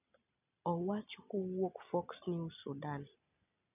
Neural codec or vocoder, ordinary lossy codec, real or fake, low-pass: none; none; real; 3.6 kHz